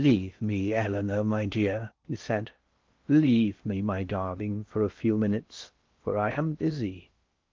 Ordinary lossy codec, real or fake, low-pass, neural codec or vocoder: Opus, 32 kbps; fake; 7.2 kHz; codec, 16 kHz in and 24 kHz out, 0.6 kbps, FocalCodec, streaming, 4096 codes